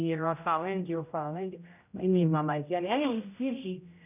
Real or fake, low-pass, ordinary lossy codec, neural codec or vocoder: fake; 3.6 kHz; none; codec, 16 kHz, 0.5 kbps, X-Codec, HuBERT features, trained on general audio